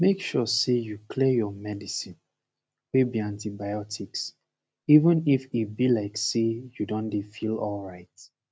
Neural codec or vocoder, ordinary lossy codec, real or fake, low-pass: none; none; real; none